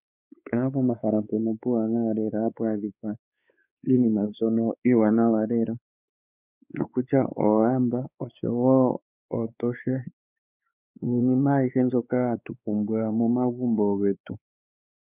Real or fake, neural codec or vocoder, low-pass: fake; codec, 16 kHz, 4 kbps, X-Codec, WavLM features, trained on Multilingual LibriSpeech; 3.6 kHz